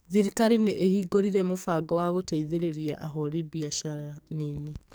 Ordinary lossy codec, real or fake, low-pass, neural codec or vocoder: none; fake; none; codec, 44.1 kHz, 2.6 kbps, SNAC